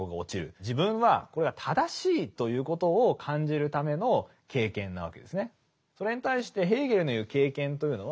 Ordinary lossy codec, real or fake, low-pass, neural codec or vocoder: none; real; none; none